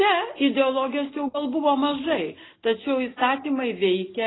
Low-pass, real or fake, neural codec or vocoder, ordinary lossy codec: 7.2 kHz; real; none; AAC, 16 kbps